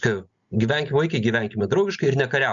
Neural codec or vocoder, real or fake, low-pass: none; real; 7.2 kHz